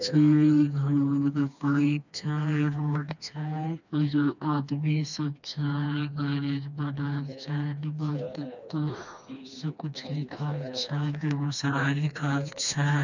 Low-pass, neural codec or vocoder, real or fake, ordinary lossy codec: 7.2 kHz; codec, 16 kHz, 2 kbps, FreqCodec, smaller model; fake; none